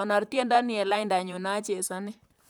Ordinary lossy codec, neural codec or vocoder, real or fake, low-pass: none; vocoder, 44.1 kHz, 128 mel bands, Pupu-Vocoder; fake; none